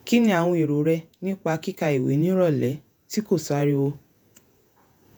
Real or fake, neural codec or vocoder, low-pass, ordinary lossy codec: fake; vocoder, 48 kHz, 128 mel bands, Vocos; none; none